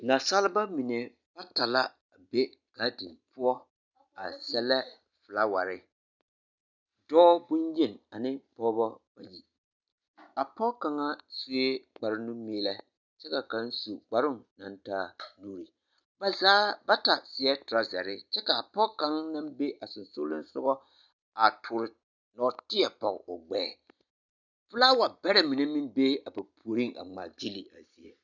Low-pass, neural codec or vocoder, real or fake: 7.2 kHz; none; real